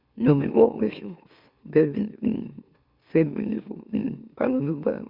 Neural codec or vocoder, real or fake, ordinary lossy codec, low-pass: autoencoder, 44.1 kHz, a latent of 192 numbers a frame, MeloTTS; fake; Opus, 64 kbps; 5.4 kHz